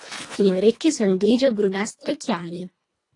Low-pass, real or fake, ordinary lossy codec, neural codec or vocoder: 10.8 kHz; fake; AAC, 48 kbps; codec, 24 kHz, 1.5 kbps, HILCodec